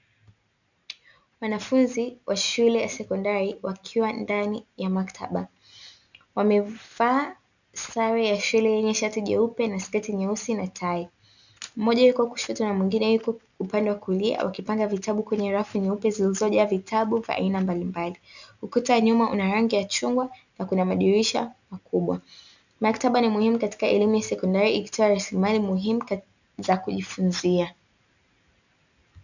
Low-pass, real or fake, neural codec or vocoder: 7.2 kHz; real; none